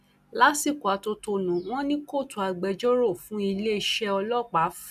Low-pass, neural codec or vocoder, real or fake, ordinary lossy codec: 14.4 kHz; none; real; none